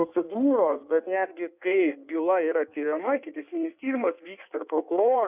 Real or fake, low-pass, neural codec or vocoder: fake; 3.6 kHz; codec, 16 kHz in and 24 kHz out, 1.1 kbps, FireRedTTS-2 codec